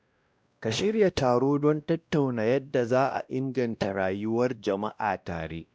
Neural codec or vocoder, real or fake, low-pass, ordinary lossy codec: codec, 16 kHz, 1 kbps, X-Codec, WavLM features, trained on Multilingual LibriSpeech; fake; none; none